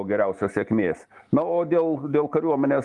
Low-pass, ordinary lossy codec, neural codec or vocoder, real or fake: 10.8 kHz; Opus, 24 kbps; none; real